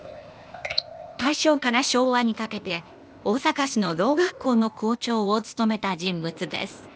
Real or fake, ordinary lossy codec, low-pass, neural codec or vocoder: fake; none; none; codec, 16 kHz, 0.8 kbps, ZipCodec